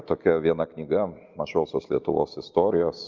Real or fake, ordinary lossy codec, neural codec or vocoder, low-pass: real; Opus, 32 kbps; none; 7.2 kHz